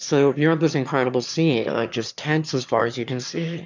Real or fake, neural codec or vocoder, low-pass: fake; autoencoder, 22.05 kHz, a latent of 192 numbers a frame, VITS, trained on one speaker; 7.2 kHz